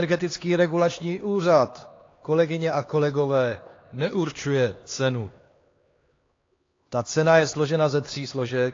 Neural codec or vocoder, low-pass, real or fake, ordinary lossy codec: codec, 16 kHz, 2 kbps, X-Codec, HuBERT features, trained on LibriSpeech; 7.2 kHz; fake; AAC, 32 kbps